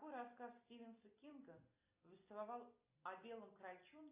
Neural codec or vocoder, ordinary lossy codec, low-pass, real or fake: none; AAC, 24 kbps; 3.6 kHz; real